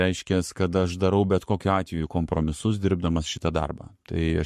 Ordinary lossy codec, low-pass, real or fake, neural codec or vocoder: MP3, 64 kbps; 14.4 kHz; fake; codec, 44.1 kHz, 7.8 kbps, Pupu-Codec